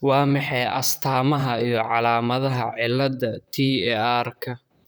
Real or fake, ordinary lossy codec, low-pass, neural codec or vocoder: fake; none; none; vocoder, 44.1 kHz, 128 mel bands, Pupu-Vocoder